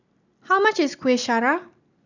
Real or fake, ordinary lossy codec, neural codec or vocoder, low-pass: real; none; none; 7.2 kHz